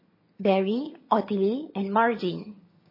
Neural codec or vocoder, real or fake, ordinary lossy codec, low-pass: vocoder, 22.05 kHz, 80 mel bands, HiFi-GAN; fake; MP3, 24 kbps; 5.4 kHz